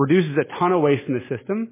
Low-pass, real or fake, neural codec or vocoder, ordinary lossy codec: 3.6 kHz; real; none; MP3, 16 kbps